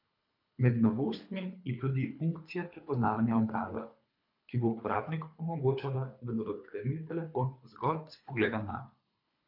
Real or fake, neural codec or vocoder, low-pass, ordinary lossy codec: fake; codec, 24 kHz, 3 kbps, HILCodec; 5.4 kHz; MP3, 48 kbps